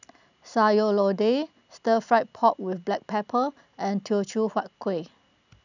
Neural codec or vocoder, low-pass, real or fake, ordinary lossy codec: none; 7.2 kHz; real; none